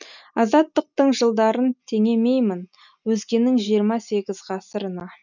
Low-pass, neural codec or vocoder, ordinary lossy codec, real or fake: 7.2 kHz; none; none; real